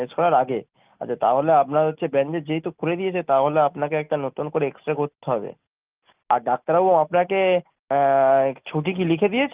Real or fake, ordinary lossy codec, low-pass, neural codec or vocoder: real; Opus, 32 kbps; 3.6 kHz; none